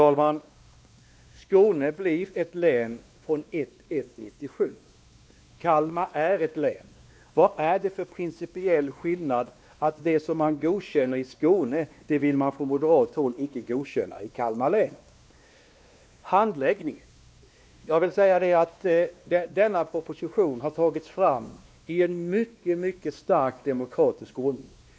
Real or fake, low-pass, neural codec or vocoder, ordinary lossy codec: fake; none; codec, 16 kHz, 2 kbps, X-Codec, WavLM features, trained on Multilingual LibriSpeech; none